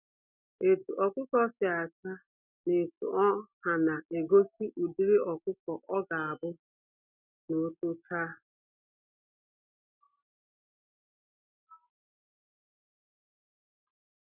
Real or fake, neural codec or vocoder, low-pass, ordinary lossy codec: real; none; 3.6 kHz; none